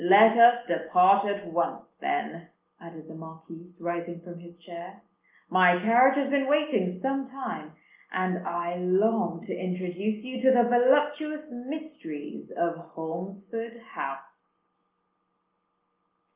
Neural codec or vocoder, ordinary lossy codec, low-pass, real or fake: none; Opus, 24 kbps; 3.6 kHz; real